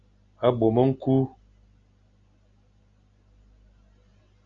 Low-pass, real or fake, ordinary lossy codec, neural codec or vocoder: 7.2 kHz; real; Opus, 64 kbps; none